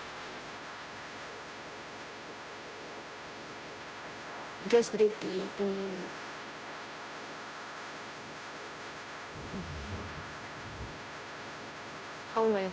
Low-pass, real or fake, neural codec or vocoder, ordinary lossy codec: none; fake; codec, 16 kHz, 0.5 kbps, FunCodec, trained on Chinese and English, 25 frames a second; none